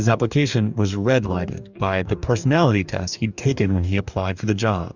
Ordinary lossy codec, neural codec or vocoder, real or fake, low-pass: Opus, 64 kbps; codec, 44.1 kHz, 2.6 kbps, DAC; fake; 7.2 kHz